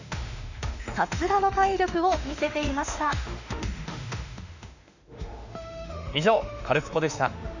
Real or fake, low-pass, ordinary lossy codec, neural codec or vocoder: fake; 7.2 kHz; none; autoencoder, 48 kHz, 32 numbers a frame, DAC-VAE, trained on Japanese speech